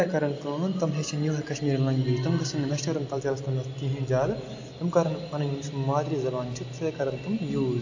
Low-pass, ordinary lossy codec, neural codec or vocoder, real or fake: 7.2 kHz; AAC, 48 kbps; none; real